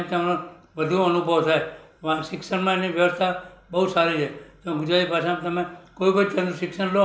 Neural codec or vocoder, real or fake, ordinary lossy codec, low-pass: none; real; none; none